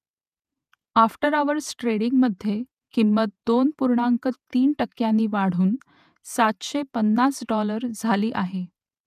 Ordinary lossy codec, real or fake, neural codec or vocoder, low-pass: none; fake; vocoder, 48 kHz, 128 mel bands, Vocos; 14.4 kHz